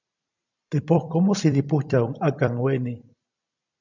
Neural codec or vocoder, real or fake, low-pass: vocoder, 44.1 kHz, 128 mel bands every 256 samples, BigVGAN v2; fake; 7.2 kHz